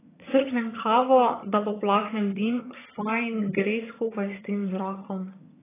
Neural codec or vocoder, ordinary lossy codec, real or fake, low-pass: vocoder, 22.05 kHz, 80 mel bands, HiFi-GAN; AAC, 16 kbps; fake; 3.6 kHz